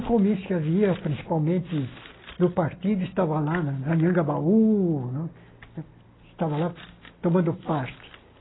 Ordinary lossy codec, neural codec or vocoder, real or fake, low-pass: AAC, 16 kbps; none; real; 7.2 kHz